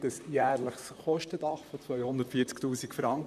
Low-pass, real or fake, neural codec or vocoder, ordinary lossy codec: 14.4 kHz; fake; vocoder, 44.1 kHz, 128 mel bands, Pupu-Vocoder; none